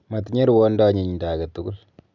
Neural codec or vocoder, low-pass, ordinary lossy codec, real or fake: none; 7.2 kHz; none; real